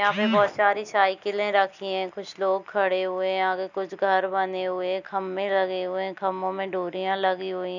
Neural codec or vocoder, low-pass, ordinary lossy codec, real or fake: none; 7.2 kHz; none; real